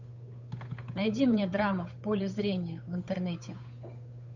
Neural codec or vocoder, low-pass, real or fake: codec, 16 kHz, 8 kbps, FunCodec, trained on Chinese and English, 25 frames a second; 7.2 kHz; fake